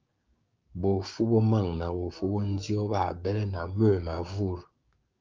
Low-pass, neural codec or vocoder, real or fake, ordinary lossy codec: 7.2 kHz; codec, 16 kHz, 6 kbps, DAC; fake; Opus, 24 kbps